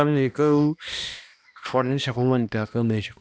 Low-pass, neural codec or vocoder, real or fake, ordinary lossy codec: none; codec, 16 kHz, 1 kbps, X-Codec, HuBERT features, trained on balanced general audio; fake; none